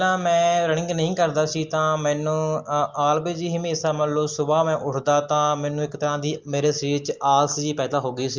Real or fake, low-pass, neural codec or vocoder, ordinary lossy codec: real; 7.2 kHz; none; Opus, 32 kbps